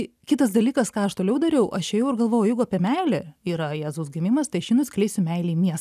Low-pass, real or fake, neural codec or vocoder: 14.4 kHz; real; none